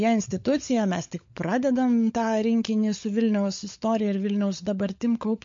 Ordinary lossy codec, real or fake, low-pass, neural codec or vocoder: MP3, 48 kbps; fake; 7.2 kHz; codec, 16 kHz, 16 kbps, FunCodec, trained on LibriTTS, 50 frames a second